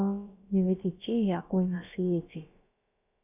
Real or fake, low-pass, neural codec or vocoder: fake; 3.6 kHz; codec, 16 kHz, about 1 kbps, DyCAST, with the encoder's durations